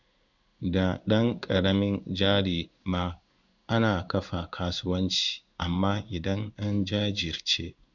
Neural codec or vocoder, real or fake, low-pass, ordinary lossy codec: codec, 16 kHz in and 24 kHz out, 1 kbps, XY-Tokenizer; fake; 7.2 kHz; none